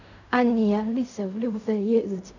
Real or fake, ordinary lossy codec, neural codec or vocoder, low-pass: fake; none; codec, 16 kHz in and 24 kHz out, 0.4 kbps, LongCat-Audio-Codec, fine tuned four codebook decoder; 7.2 kHz